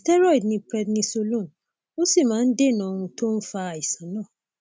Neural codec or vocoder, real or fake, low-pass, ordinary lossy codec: none; real; none; none